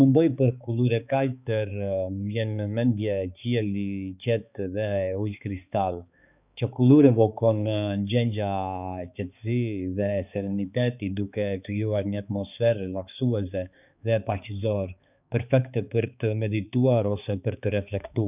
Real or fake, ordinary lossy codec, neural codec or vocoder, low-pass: fake; none; codec, 16 kHz, 4 kbps, X-Codec, HuBERT features, trained on balanced general audio; 3.6 kHz